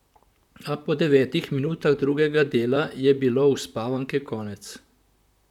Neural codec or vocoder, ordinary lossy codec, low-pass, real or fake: vocoder, 44.1 kHz, 128 mel bands, Pupu-Vocoder; none; 19.8 kHz; fake